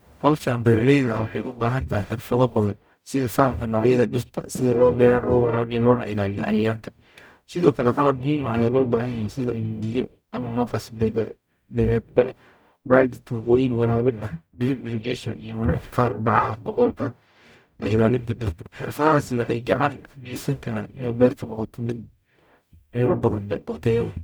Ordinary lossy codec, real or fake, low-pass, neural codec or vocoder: none; fake; none; codec, 44.1 kHz, 0.9 kbps, DAC